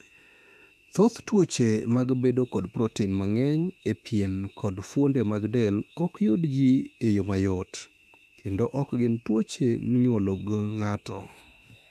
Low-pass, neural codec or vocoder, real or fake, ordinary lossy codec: 14.4 kHz; autoencoder, 48 kHz, 32 numbers a frame, DAC-VAE, trained on Japanese speech; fake; none